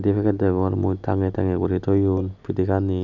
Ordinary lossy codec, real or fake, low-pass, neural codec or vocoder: none; real; 7.2 kHz; none